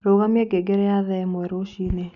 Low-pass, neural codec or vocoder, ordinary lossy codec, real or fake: 7.2 kHz; none; none; real